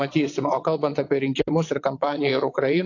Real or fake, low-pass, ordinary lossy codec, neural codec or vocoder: fake; 7.2 kHz; AAC, 48 kbps; vocoder, 22.05 kHz, 80 mel bands, WaveNeXt